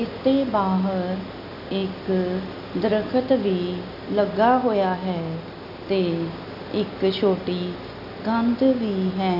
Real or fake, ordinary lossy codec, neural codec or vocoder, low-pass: real; none; none; 5.4 kHz